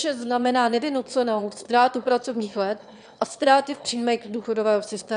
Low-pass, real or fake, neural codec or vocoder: 9.9 kHz; fake; autoencoder, 22.05 kHz, a latent of 192 numbers a frame, VITS, trained on one speaker